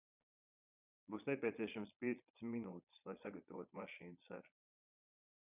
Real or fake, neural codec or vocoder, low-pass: fake; vocoder, 22.05 kHz, 80 mel bands, Vocos; 3.6 kHz